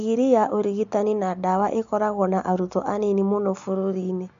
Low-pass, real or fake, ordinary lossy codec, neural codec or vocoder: 7.2 kHz; real; MP3, 48 kbps; none